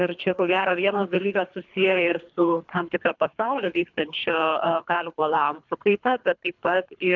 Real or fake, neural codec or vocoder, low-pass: fake; codec, 24 kHz, 3 kbps, HILCodec; 7.2 kHz